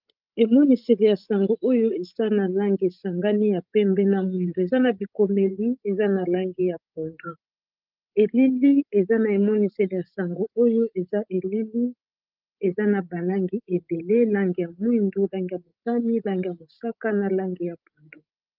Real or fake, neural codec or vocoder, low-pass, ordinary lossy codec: fake; codec, 16 kHz, 16 kbps, FreqCodec, larger model; 5.4 kHz; Opus, 32 kbps